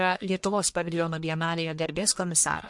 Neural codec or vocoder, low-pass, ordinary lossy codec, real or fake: codec, 44.1 kHz, 1.7 kbps, Pupu-Codec; 10.8 kHz; MP3, 64 kbps; fake